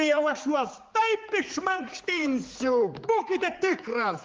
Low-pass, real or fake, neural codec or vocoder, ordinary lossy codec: 7.2 kHz; fake; codec, 16 kHz, 4 kbps, X-Codec, HuBERT features, trained on general audio; Opus, 32 kbps